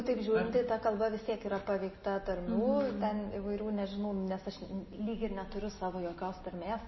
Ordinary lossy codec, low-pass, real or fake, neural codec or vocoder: MP3, 24 kbps; 7.2 kHz; real; none